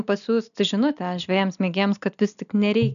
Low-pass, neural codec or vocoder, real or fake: 7.2 kHz; none; real